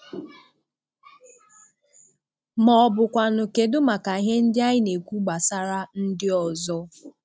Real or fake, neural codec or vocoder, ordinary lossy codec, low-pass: real; none; none; none